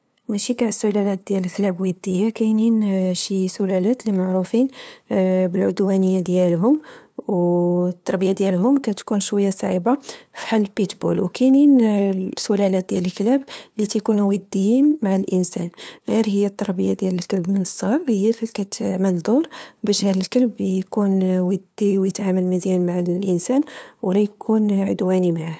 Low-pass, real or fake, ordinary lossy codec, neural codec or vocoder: none; fake; none; codec, 16 kHz, 2 kbps, FunCodec, trained on LibriTTS, 25 frames a second